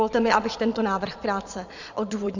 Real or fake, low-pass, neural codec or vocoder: fake; 7.2 kHz; vocoder, 22.05 kHz, 80 mel bands, Vocos